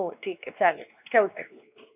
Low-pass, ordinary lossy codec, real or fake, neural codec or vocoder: 3.6 kHz; none; fake; codec, 16 kHz, 2 kbps, X-Codec, WavLM features, trained on Multilingual LibriSpeech